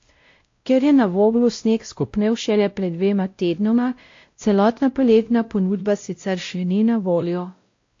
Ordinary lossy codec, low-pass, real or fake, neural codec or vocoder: AAC, 48 kbps; 7.2 kHz; fake; codec, 16 kHz, 0.5 kbps, X-Codec, WavLM features, trained on Multilingual LibriSpeech